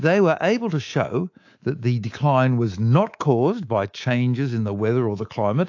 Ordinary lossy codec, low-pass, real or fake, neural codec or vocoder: AAC, 48 kbps; 7.2 kHz; fake; codec, 24 kHz, 3.1 kbps, DualCodec